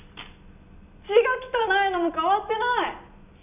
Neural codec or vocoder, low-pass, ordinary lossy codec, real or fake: none; 3.6 kHz; none; real